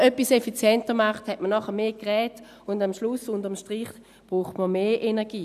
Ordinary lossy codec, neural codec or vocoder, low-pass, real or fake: none; none; 14.4 kHz; real